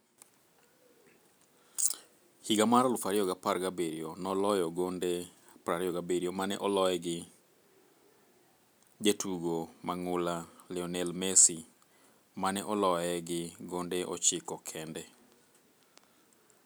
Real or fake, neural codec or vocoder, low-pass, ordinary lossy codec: real; none; none; none